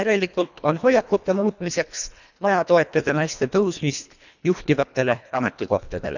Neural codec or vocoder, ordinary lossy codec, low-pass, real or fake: codec, 24 kHz, 1.5 kbps, HILCodec; none; 7.2 kHz; fake